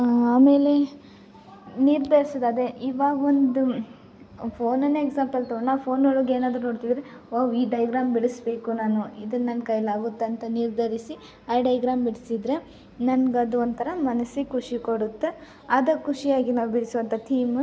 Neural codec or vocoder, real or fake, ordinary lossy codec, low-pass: none; real; none; none